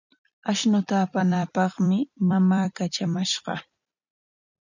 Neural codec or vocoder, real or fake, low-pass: vocoder, 44.1 kHz, 128 mel bands every 256 samples, BigVGAN v2; fake; 7.2 kHz